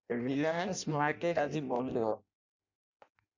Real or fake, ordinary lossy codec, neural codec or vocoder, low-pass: fake; MP3, 64 kbps; codec, 16 kHz in and 24 kHz out, 0.6 kbps, FireRedTTS-2 codec; 7.2 kHz